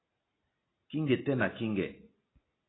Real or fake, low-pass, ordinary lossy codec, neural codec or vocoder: real; 7.2 kHz; AAC, 16 kbps; none